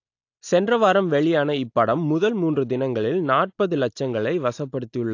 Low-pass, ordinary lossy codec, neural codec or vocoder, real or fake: 7.2 kHz; AAC, 48 kbps; none; real